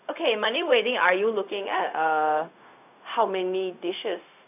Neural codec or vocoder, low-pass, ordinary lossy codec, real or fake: codec, 16 kHz, 0.4 kbps, LongCat-Audio-Codec; 3.6 kHz; none; fake